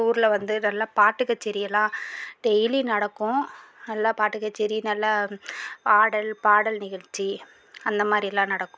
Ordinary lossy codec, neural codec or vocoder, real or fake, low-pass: none; none; real; none